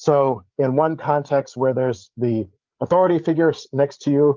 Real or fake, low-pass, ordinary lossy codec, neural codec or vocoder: fake; 7.2 kHz; Opus, 24 kbps; codec, 16 kHz, 8 kbps, FreqCodec, larger model